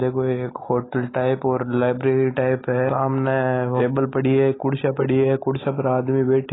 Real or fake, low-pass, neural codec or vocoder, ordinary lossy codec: real; 7.2 kHz; none; AAC, 16 kbps